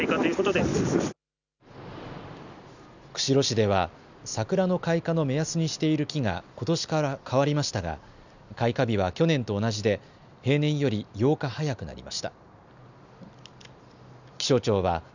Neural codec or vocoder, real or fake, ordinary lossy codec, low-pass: none; real; none; 7.2 kHz